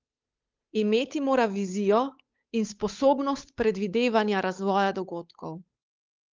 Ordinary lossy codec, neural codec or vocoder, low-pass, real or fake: Opus, 24 kbps; codec, 16 kHz, 8 kbps, FunCodec, trained on Chinese and English, 25 frames a second; 7.2 kHz; fake